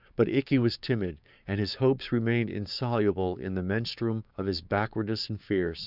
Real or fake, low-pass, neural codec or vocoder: fake; 5.4 kHz; codec, 16 kHz, 6 kbps, DAC